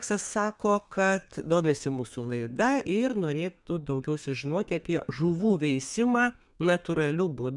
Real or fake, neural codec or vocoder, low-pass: fake; codec, 32 kHz, 1.9 kbps, SNAC; 10.8 kHz